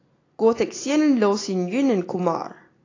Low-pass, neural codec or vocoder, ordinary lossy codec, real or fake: 7.2 kHz; none; AAC, 32 kbps; real